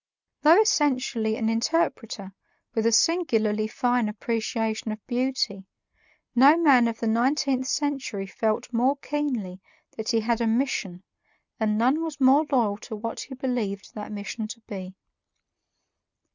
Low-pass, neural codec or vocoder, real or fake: 7.2 kHz; none; real